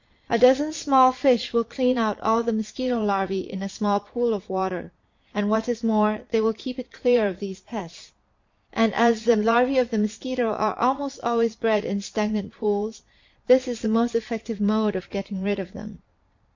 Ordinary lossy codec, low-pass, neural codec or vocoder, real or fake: MP3, 48 kbps; 7.2 kHz; vocoder, 22.05 kHz, 80 mel bands, Vocos; fake